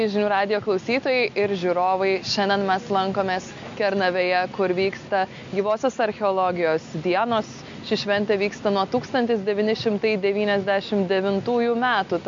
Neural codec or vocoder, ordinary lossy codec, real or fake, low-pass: none; MP3, 48 kbps; real; 7.2 kHz